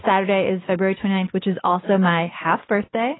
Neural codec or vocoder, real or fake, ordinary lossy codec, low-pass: none; real; AAC, 16 kbps; 7.2 kHz